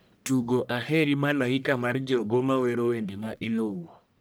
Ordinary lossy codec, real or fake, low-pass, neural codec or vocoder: none; fake; none; codec, 44.1 kHz, 1.7 kbps, Pupu-Codec